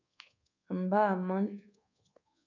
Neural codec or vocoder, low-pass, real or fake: codec, 24 kHz, 1.2 kbps, DualCodec; 7.2 kHz; fake